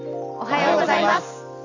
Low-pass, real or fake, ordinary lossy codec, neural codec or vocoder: 7.2 kHz; real; none; none